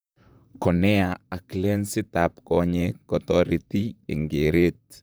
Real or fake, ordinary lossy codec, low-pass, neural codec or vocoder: fake; none; none; vocoder, 44.1 kHz, 128 mel bands, Pupu-Vocoder